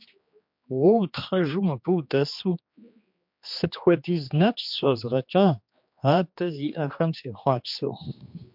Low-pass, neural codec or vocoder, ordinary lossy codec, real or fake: 5.4 kHz; codec, 16 kHz, 2 kbps, X-Codec, HuBERT features, trained on general audio; MP3, 48 kbps; fake